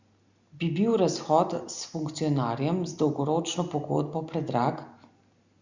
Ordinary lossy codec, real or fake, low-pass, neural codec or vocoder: Opus, 64 kbps; real; 7.2 kHz; none